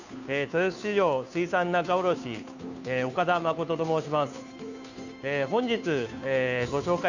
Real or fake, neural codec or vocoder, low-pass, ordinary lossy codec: fake; codec, 16 kHz, 2 kbps, FunCodec, trained on Chinese and English, 25 frames a second; 7.2 kHz; none